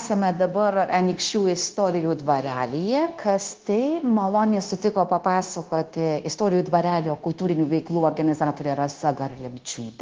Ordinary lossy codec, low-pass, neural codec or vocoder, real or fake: Opus, 16 kbps; 7.2 kHz; codec, 16 kHz, 0.9 kbps, LongCat-Audio-Codec; fake